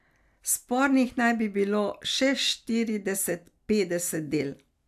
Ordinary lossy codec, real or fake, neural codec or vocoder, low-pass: AAC, 96 kbps; real; none; 14.4 kHz